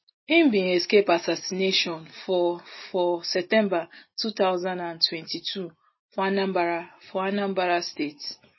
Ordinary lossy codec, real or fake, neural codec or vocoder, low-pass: MP3, 24 kbps; real; none; 7.2 kHz